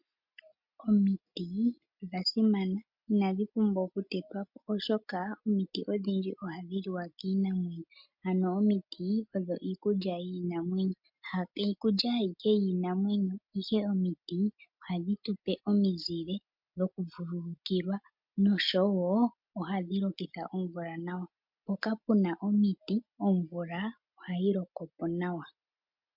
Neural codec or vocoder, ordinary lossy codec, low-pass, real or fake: none; MP3, 48 kbps; 5.4 kHz; real